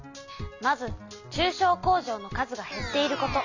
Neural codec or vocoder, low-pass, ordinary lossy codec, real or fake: none; 7.2 kHz; AAC, 48 kbps; real